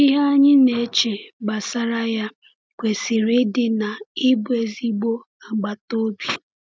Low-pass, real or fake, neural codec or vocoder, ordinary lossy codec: none; real; none; none